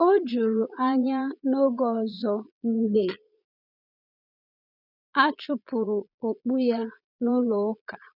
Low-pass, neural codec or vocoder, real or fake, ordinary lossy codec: 5.4 kHz; vocoder, 22.05 kHz, 80 mel bands, Vocos; fake; none